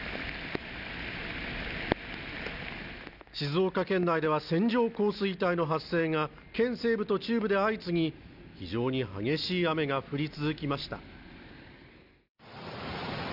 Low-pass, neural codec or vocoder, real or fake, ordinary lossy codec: 5.4 kHz; none; real; none